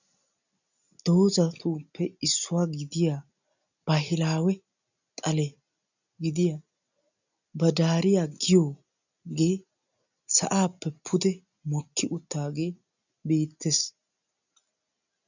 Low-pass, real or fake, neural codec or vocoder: 7.2 kHz; real; none